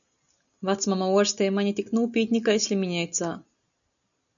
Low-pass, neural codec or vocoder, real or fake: 7.2 kHz; none; real